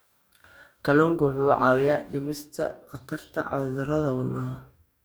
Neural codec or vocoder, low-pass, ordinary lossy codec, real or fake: codec, 44.1 kHz, 2.6 kbps, DAC; none; none; fake